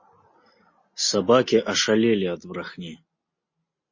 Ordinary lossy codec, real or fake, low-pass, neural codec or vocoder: MP3, 32 kbps; real; 7.2 kHz; none